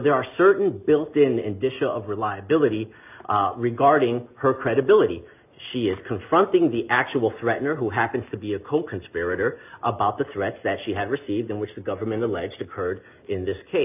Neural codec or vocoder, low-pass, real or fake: none; 3.6 kHz; real